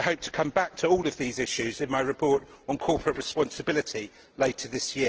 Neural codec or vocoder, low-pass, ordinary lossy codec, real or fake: none; 7.2 kHz; Opus, 16 kbps; real